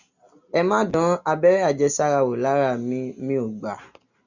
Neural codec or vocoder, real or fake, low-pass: none; real; 7.2 kHz